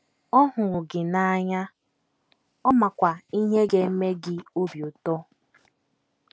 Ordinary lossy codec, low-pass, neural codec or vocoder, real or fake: none; none; none; real